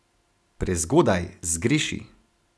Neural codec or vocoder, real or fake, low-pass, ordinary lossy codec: none; real; none; none